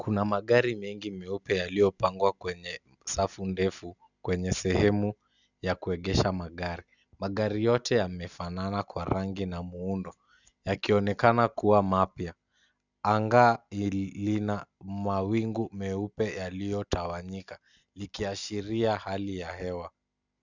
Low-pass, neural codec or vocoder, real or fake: 7.2 kHz; none; real